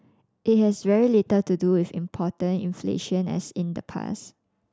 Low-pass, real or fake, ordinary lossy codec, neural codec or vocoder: none; real; none; none